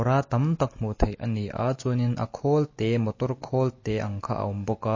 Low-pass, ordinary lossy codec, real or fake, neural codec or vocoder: 7.2 kHz; MP3, 32 kbps; real; none